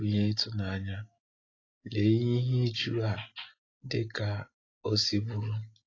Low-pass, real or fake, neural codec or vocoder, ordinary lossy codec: 7.2 kHz; real; none; AAC, 32 kbps